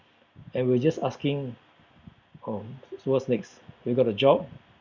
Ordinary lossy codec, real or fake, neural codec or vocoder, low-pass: Opus, 64 kbps; real; none; 7.2 kHz